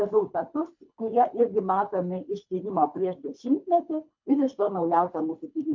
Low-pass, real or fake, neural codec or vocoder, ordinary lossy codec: 7.2 kHz; fake; codec, 24 kHz, 3 kbps, HILCodec; MP3, 48 kbps